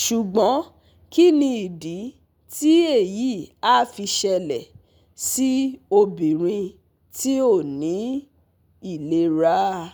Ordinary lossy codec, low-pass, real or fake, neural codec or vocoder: none; none; real; none